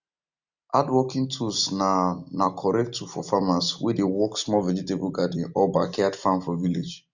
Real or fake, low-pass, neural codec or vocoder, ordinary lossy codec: real; 7.2 kHz; none; none